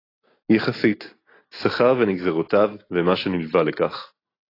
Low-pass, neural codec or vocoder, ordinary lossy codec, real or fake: 5.4 kHz; none; AAC, 24 kbps; real